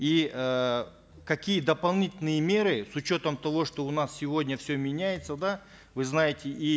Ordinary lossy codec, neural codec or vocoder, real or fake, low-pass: none; none; real; none